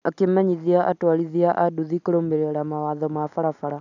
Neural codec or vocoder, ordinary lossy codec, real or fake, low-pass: none; none; real; 7.2 kHz